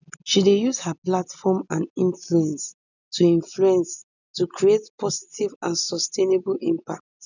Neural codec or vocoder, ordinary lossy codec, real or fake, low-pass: none; none; real; 7.2 kHz